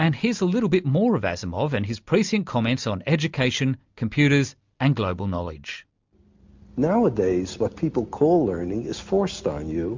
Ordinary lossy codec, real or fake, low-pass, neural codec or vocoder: MP3, 64 kbps; real; 7.2 kHz; none